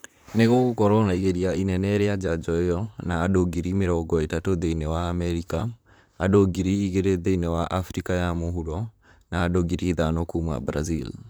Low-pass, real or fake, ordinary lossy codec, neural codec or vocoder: none; fake; none; vocoder, 44.1 kHz, 128 mel bands, Pupu-Vocoder